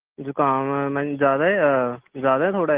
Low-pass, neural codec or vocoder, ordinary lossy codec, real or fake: 3.6 kHz; none; Opus, 16 kbps; real